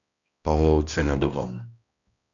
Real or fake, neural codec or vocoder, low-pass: fake; codec, 16 kHz, 1 kbps, X-Codec, HuBERT features, trained on balanced general audio; 7.2 kHz